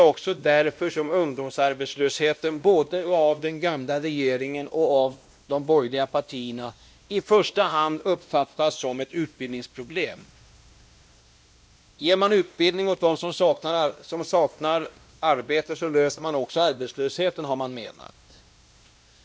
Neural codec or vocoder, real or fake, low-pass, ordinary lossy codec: codec, 16 kHz, 1 kbps, X-Codec, WavLM features, trained on Multilingual LibriSpeech; fake; none; none